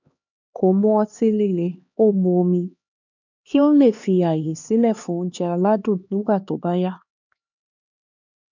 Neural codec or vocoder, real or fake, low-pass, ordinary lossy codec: codec, 16 kHz, 2 kbps, X-Codec, HuBERT features, trained on LibriSpeech; fake; 7.2 kHz; none